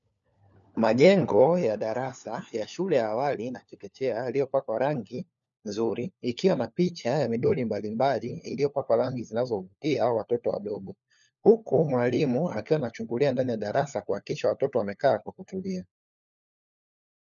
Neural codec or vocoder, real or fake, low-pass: codec, 16 kHz, 4 kbps, FunCodec, trained on LibriTTS, 50 frames a second; fake; 7.2 kHz